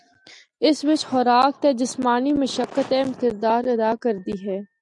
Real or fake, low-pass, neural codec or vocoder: real; 10.8 kHz; none